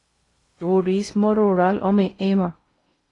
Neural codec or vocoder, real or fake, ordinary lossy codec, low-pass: codec, 16 kHz in and 24 kHz out, 0.8 kbps, FocalCodec, streaming, 65536 codes; fake; AAC, 32 kbps; 10.8 kHz